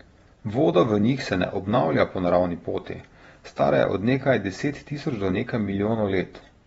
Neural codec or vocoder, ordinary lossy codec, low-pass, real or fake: vocoder, 48 kHz, 128 mel bands, Vocos; AAC, 24 kbps; 19.8 kHz; fake